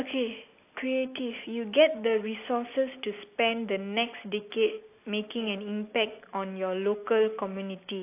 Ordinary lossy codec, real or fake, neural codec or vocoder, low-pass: AAC, 24 kbps; real; none; 3.6 kHz